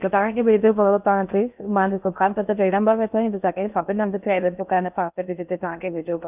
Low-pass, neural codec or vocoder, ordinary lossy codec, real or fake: 3.6 kHz; codec, 16 kHz in and 24 kHz out, 0.6 kbps, FocalCodec, streaming, 4096 codes; none; fake